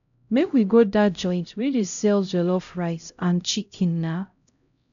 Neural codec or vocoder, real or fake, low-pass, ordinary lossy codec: codec, 16 kHz, 0.5 kbps, X-Codec, HuBERT features, trained on LibriSpeech; fake; 7.2 kHz; none